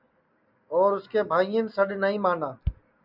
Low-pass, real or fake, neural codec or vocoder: 5.4 kHz; real; none